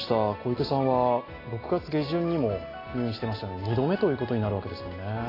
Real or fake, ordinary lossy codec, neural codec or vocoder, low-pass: real; AAC, 24 kbps; none; 5.4 kHz